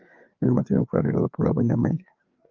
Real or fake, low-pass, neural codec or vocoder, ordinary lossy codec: fake; 7.2 kHz; codec, 16 kHz, 4 kbps, FreqCodec, larger model; Opus, 32 kbps